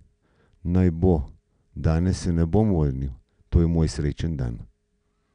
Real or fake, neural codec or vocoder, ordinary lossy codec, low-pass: real; none; none; 9.9 kHz